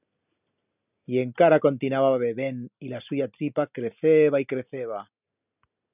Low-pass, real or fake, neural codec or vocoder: 3.6 kHz; real; none